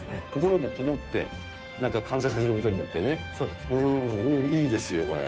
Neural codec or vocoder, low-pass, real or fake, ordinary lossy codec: codec, 16 kHz, 2 kbps, FunCodec, trained on Chinese and English, 25 frames a second; none; fake; none